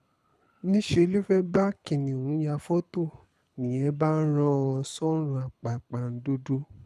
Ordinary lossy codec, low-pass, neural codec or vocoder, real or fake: none; none; codec, 24 kHz, 6 kbps, HILCodec; fake